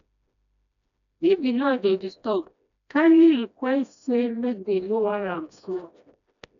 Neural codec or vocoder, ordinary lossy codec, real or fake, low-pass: codec, 16 kHz, 1 kbps, FreqCodec, smaller model; none; fake; 7.2 kHz